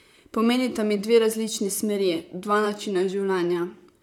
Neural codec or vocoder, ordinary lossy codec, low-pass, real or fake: vocoder, 44.1 kHz, 128 mel bands, Pupu-Vocoder; none; 19.8 kHz; fake